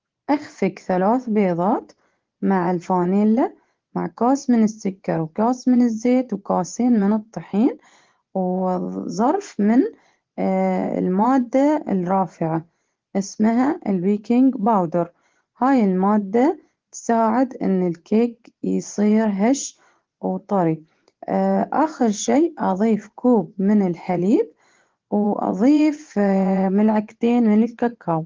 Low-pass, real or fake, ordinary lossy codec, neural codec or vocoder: 7.2 kHz; fake; Opus, 16 kbps; vocoder, 24 kHz, 100 mel bands, Vocos